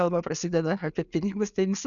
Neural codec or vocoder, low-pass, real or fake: none; 7.2 kHz; real